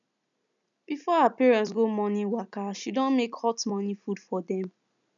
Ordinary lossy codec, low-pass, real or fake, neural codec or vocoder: none; 7.2 kHz; real; none